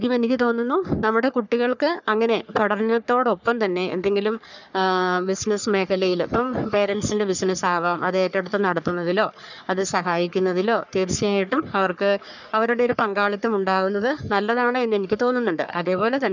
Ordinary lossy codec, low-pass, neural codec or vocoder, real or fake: none; 7.2 kHz; codec, 44.1 kHz, 3.4 kbps, Pupu-Codec; fake